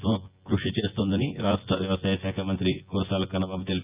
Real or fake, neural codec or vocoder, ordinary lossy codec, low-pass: fake; vocoder, 24 kHz, 100 mel bands, Vocos; Opus, 24 kbps; 3.6 kHz